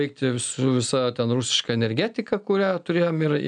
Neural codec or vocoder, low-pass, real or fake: none; 9.9 kHz; real